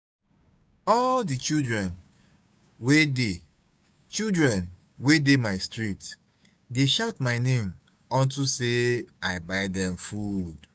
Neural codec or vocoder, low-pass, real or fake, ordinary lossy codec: codec, 16 kHz, 6 kbps, DAC; none; fake; none